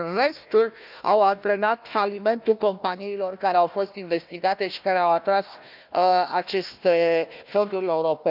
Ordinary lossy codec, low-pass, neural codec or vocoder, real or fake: none; 5.4 kHz; codec, 16 kHz, 1 kbps, FunCodec, trained on Chinese and English, 50 frames a second; fake